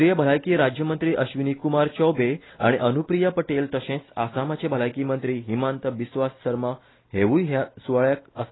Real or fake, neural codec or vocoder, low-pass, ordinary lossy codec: real; none; 7.2 kHz; AAC, 16 kbps